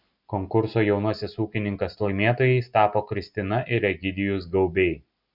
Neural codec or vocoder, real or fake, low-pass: none; real; 5.4 kHz